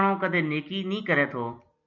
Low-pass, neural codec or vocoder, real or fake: 7.2 kHz; none; real